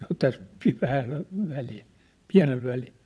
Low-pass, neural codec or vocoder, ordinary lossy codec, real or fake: none; vocoder, 22.05 kHz, 80 mel bands, WaveNeXt; none; fake